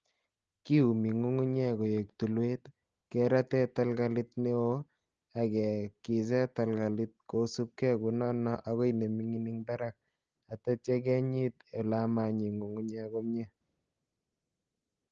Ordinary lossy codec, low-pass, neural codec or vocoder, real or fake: Opus, 16 kbps; 7.2 kHz; none; real